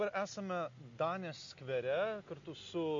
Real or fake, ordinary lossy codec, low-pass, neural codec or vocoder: real; MP3, 48 kbps; 7.2 kHz; none